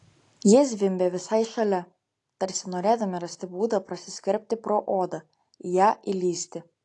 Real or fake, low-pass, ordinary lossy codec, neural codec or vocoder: real; 10.8 kHz; AAC, 48 kbps; none